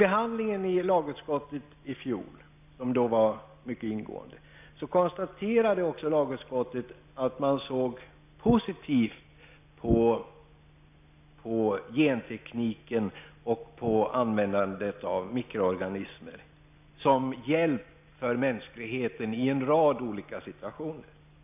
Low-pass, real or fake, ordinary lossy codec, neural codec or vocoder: 3.6 kHz; real; none; none